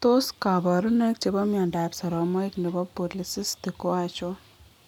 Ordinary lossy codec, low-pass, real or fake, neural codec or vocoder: none; 19.8 kHz; real; none